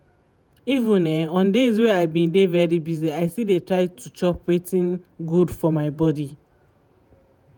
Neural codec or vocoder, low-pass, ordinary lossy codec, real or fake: vocoder, 48 kHz, 128 mel bands, Vocos; none; none; fake